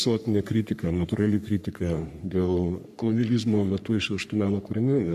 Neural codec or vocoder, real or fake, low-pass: codec, 44.1 kHz, 3.4 kbps, Pupu-Codec; fake; 14.4 kHz